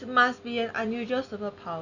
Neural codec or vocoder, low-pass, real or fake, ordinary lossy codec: none; 7.2 kHz; real; none